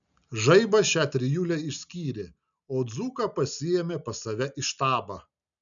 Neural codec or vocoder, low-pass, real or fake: none; 7.2 kHz; real